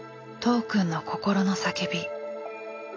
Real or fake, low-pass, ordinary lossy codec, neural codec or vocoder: real; 7.2 kHz; AAC, 32 kbps; none